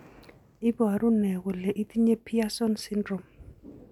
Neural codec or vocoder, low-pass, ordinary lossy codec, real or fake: none; 19.8 kHz; none; real